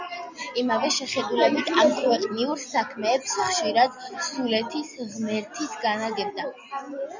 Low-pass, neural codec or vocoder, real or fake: 7.2 kHz; none; real